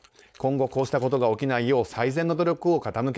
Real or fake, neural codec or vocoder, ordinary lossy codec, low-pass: fake; codec, 16 kHz, 4.8 kbps, FACodec; none; none